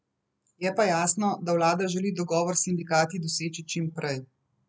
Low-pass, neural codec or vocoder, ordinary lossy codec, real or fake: none; none; none; real